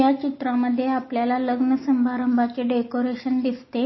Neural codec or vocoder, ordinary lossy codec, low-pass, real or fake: none; MP3, 24 kbps; 7.2 kHz; real